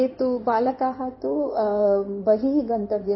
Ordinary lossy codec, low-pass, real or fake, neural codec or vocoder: MP3, 24 kbps; 7.2 kHz; fake; codec, 16 kHz in and 24 kHz out, 2.2 kbps, FireRedTTS-2 codec